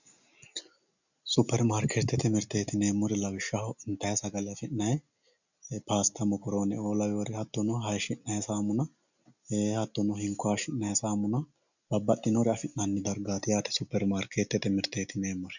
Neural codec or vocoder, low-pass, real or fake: none; 7.2 kHz; real